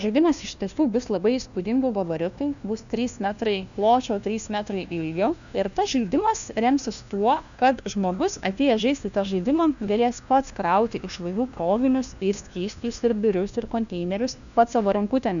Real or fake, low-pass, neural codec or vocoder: fake; 7.2 kHz; codec, 16 kHz, 1 kbps, FunCodec, trained on LibriTTS, 50 frames a second